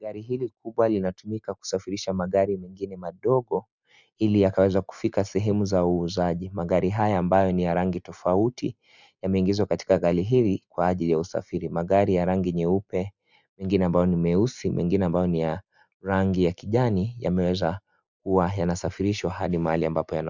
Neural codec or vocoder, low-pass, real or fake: none; 7.2 kHz; real